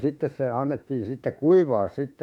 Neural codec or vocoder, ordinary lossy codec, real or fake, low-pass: autoencoder, 48 kHz, 32 numbers a frame, DAC-VAE, trained on Japanese speech; none; fake; 19.8 kHz